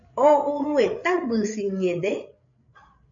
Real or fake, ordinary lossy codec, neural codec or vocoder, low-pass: fake; AAC, 64 kbps; codec, 16 kHz, 8 kbps, FreqCodec, larger model; 7.2 kHz